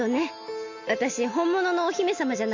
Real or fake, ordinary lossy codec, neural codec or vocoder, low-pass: real; AAC, 48 kbps; none; 7.2 kHz